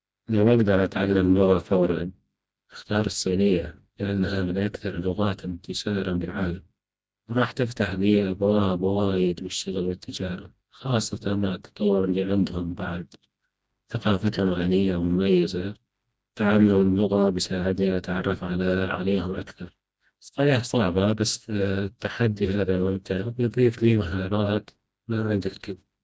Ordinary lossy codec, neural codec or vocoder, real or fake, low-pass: none; codec, 16 kHz, 1 kbps, FreqCodec, smaller model; fake; none